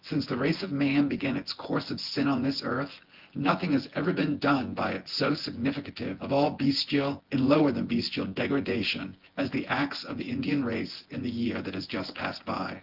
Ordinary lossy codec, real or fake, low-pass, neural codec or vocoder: Opus, 16 kbps; fake; 5.4 kHz; vocoder, 24 kHz, 100 mel bands, Vocos